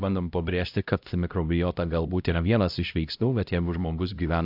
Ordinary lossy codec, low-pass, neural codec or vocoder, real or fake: AAC, 48 kbps; 5.4 kHz; codec, 16 kHz, 0.5 kbps, X-Codec, HuBERT features, trained on LibriSpeech; fake